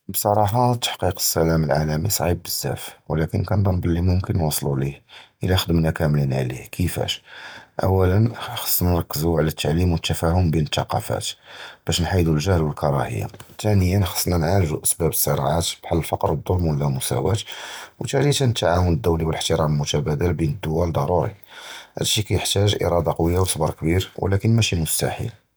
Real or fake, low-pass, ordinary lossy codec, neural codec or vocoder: real; none; none; none